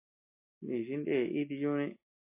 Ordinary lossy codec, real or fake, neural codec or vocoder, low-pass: MP3, 24 kbps; real; none; 3.6 kHz